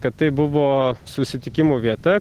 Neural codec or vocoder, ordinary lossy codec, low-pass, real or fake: none; Opus, 16 kbps; 14.4 kHz; real